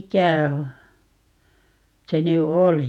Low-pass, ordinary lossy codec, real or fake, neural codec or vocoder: 19.8 kHz; none; fake; vocoder, 48 kHz, 128 mel bands, Vocos